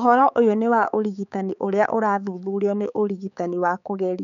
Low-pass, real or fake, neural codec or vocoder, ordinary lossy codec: 7.2 kHz; fake; codec, 16 kHz, 4 kbps, X-Codec, HuBERT features, trained on balanced general audio; none